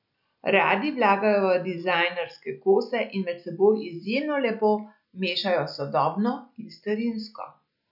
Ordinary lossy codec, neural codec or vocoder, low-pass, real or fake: none; none; 5.4 kHz; real